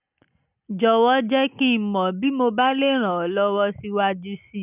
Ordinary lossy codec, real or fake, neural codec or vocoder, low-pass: none; real; none; 3.6 kHz